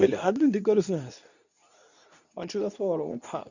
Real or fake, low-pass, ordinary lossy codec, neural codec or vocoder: fake; 7.2 kHz; none; codec, 24 kHz, 0.9 kbps, WavTokenizer, medium speech release version 2